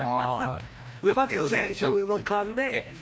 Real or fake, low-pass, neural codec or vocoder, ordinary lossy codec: fake; none; codec, 16 kHz, 1 kbps, FreqCodec, larger model; none